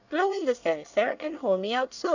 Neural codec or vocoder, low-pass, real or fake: codec, 24 kHz, 1 kbps, SNAC; 7.2 kHz; fake